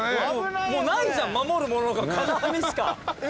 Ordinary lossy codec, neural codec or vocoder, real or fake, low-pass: none; none; real; none